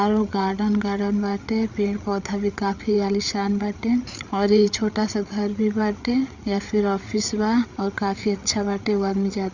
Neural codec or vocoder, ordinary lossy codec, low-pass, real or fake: codec, 16 kHz, 8 kbps, FreqCodec, larger model; Opus, 64 kbps; 7.2 kHz; fake